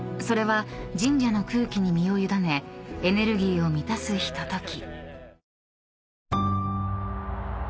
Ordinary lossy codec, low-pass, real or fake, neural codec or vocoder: none; none; real; none